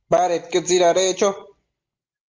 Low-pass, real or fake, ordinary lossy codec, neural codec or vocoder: 7.2 kHz; real; Opus, 24 kbps; none